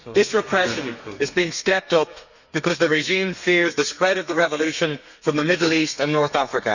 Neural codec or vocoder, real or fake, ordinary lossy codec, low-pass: codec, 32 kHz, 1.9 kbps, SNAC; fake; none; 7.2 kHz